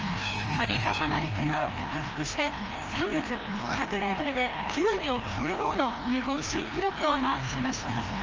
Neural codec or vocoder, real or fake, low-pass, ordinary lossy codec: codec, 16 kHz, 1 kbps, FreqCodec, larger model; fake; 7.2 kHz; Opus, 24 kbps